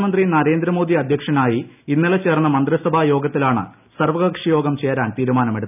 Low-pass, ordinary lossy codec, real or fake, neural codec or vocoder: 3.6 kHz; none; real; none